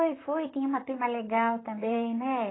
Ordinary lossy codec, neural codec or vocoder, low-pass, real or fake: AAC, 16 kbps; vocoder, 44.1 kHz, 128 mel bands, Pupu-Vocoder; 7.2 kHz; fake